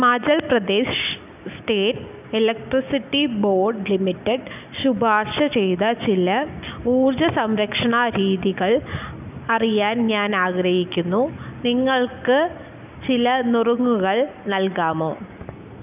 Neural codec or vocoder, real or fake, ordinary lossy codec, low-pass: none; real; none; 3.6 kHz